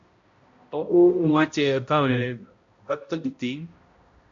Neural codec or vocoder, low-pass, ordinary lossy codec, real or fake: codec, 16 kHz, 0.5 kbps, X-Codec, HuBERT features, trained on balanced general audio; 7.2 kHz; MP3, 48 kbps; fake